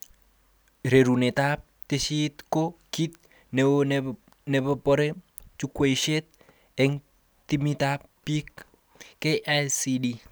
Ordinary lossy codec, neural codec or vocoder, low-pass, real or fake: none; none; none; real